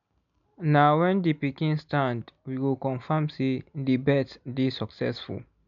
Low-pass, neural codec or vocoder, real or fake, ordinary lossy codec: 7.2 kHz; none; real; none